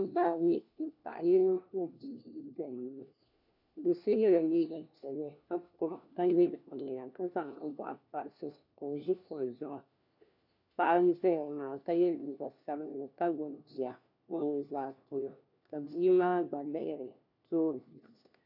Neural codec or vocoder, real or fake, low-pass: codec, 16 kHz, 1 kbps, FunCodec, trained on LibriTTS, 50 frames a second; fake; 5.4 kHz